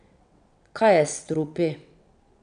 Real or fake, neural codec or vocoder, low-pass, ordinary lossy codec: fake; vocoder, 22.05 kHz, 80 mel bands, WaveNeXt; 9.9 kHz; none